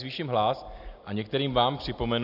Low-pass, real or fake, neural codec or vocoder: 5.4 kHz; real; none